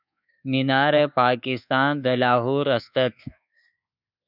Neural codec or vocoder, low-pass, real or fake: codec, 24 kHz, 1.2 kbps, DualCodec; 5.4 kHz; fake